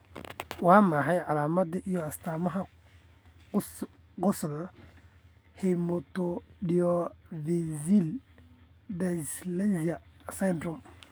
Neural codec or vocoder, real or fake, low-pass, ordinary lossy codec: codec, 44.1 kHz, 7.8 kbps, DAC; fake; none; none